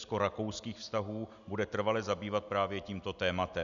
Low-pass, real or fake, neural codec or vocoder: 7.2 kHz; real; none